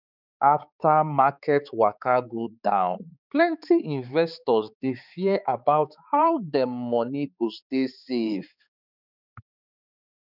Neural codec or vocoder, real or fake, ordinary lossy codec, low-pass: codec, 16 kHz, 4 kbps, X-Codec, HuBERT features, trained on balanced general audio; fake; none; 5.4 kHz